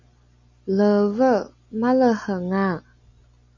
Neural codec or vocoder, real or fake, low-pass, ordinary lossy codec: none; real; 7.2 kHz; MP3, 64 kbps